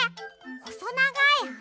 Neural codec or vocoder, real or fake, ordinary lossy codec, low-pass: none; real; none; none